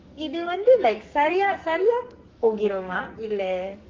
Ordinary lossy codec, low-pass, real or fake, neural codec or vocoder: Opus, 24 kbps; 7.2 kHz; fake; codec, 44.1 kHz, 2.6 kbps, SNAC